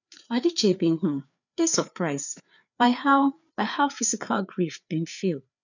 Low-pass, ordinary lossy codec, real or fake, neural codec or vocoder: 7.2 kHz; none; fake; codec, 16 kHz, 4 kbps, FreqCodec, larger model